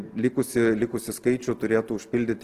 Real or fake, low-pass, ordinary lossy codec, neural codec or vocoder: real; 14.4 kHz; Opus, 16 kbps; none